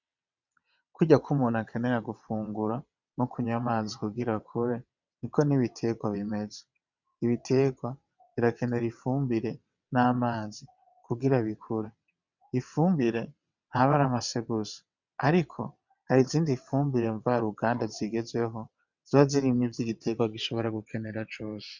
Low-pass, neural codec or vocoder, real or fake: 7.2 kHz; vocoder, 22.05 kHz, 80 mel bands, WaveNeXt; fake